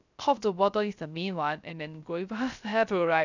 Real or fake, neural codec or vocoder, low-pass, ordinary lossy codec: fake; codec, 16 kHz, 0.3 kbps, FocalCodec; 7.2 kHz; none